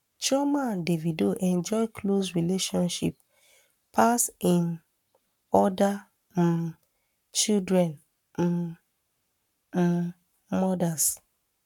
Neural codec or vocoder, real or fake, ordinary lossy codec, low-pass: codec, 44.1 kHz, 7.8 kbps, Pupu-Codec; fake; none; 19.8 kHz